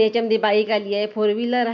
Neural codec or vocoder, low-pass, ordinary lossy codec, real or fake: none; 7.2 kHz; none; real